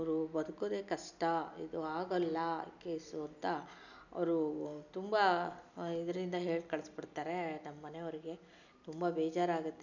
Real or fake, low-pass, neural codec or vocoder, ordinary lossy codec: real; 7.2 kHz; none; none